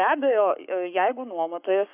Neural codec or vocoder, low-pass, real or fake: codec, 44.1 kHz, 7.8 kbps, Pupu-Codec; 3.6 kHz; fake